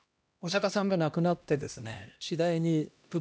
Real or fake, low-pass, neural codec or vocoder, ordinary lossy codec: fake; none; codec, 16 kHz, 1 kbps, X-Codec, HuBERT features, trained on LibriSpeech; none